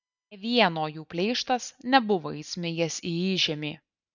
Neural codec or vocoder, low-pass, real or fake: none; 7.2 kHz; real